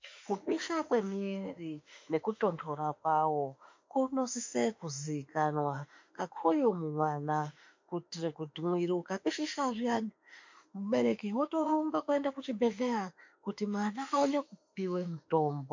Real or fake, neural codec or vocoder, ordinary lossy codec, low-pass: fake; autoencoder, 48 kHz, 32 numbers a frame, DAC-VAE, trained on Japanese speech; MP3, 48 kbps; 7.2 kHz